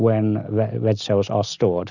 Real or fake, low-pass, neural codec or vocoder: real; 7.2 kHz; none